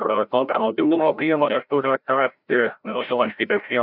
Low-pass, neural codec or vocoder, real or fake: 5.4 kHz; codec, 16 kHz, 0.5 kbps, FreqCodec, larger model; fake